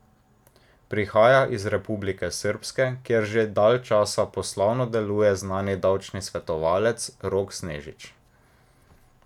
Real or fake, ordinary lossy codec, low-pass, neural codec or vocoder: real; none; 19.8 kHz; none